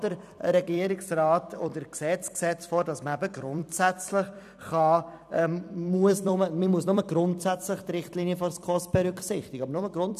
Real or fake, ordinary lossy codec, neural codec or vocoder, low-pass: fake; none; vocoder, 44.1 kHz, 128 mel bands every 256 samples, BigVGAN v2; 14.4 kHz